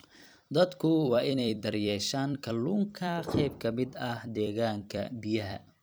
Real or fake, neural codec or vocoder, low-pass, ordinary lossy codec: fake; vocoder, 44.1 kHz, 128 mel bands every 256 samples, BigVGAN v2; none; none